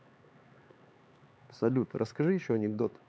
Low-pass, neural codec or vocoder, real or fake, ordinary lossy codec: none; codec, 16 kHz, 4 kbps, X-Codec, HuBERT features, trained on LibriSpeech; fake; none